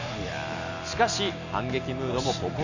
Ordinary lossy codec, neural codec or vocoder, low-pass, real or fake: none; none; 7.2 kHz; real